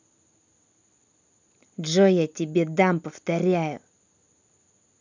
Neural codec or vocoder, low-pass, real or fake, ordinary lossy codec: none; 7.2 kHz; real; none